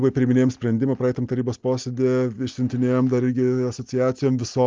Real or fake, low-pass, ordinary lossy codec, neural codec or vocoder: real; 7.2 kHz; Opus, 24 kbps; none